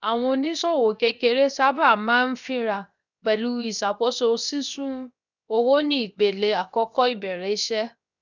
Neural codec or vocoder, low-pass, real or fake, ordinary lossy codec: codec, 16 kHz, 0.7 kbps, FocalCodec; 7.2 kHz; fake; none